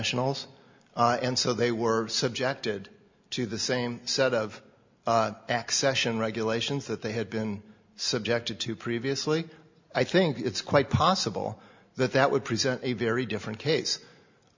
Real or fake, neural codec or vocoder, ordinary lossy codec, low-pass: real; none; MP3, 64 kbps; 7.2 kHz